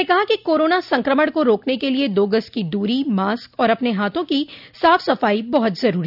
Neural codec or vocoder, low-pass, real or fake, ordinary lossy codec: none; 5.4 kHz; real; none